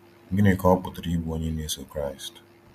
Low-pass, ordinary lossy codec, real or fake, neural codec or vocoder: 14.4 kHz; none; real; none